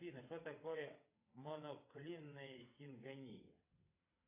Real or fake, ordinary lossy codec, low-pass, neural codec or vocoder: fake; AAC, 24 kbps; 3.6 kHz; vocoder, 22.05 kHz, 80 mel bands, WaveNeXt